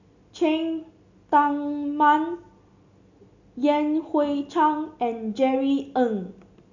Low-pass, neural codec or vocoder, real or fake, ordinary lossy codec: 7.2 kHz; none; real; none